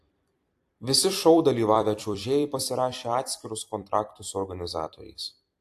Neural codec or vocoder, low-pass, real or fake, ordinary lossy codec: vocoder, 44.1 kHz, 128 mel bands every 256 samples, BigVGAN v2; 14.4 kHz; fake; AAC, 64 kbps